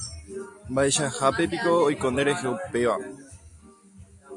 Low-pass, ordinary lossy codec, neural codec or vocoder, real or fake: 10.8 kHz; AAC, 64 kbps; none; real